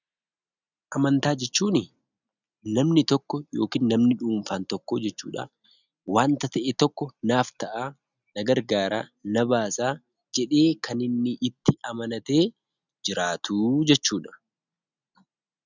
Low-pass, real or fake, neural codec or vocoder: 7.2 kHz; real; none